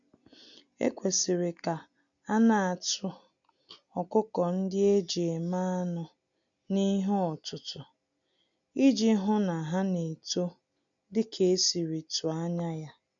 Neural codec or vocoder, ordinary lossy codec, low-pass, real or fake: none; none; 7.2 kHz; real